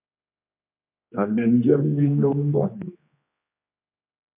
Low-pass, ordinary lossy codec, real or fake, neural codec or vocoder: 3.6 kHz; MP3, 32 kbps; fake; codec, 16 kHz, 2 kbps, X-Codec, HuBERT features, trained on general audio